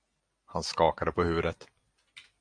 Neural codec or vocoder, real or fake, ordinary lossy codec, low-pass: none; real; MP3, 64 kbps; 9.9 kHz